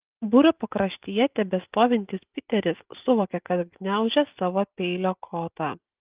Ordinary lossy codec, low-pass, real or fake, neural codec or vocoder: Opus, 32 kbps; 3.6 kHz; real; none